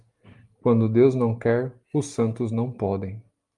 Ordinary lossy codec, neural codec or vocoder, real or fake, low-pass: Opus, 32 kbps; none; real; 10.8 kHz